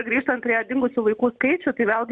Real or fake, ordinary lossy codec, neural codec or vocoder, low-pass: real; MP3, 96 kbps; none; 9.9 kHz